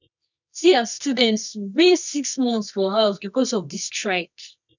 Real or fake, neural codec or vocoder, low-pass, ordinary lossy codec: fake; codec, 24 kHz, 0.9 kbps, WavTokenizer, medium music audio release; 7.2 kHz; none